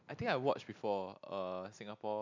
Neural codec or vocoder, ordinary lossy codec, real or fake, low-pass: none; MP3, 64 kbps; real; 7.2 kHz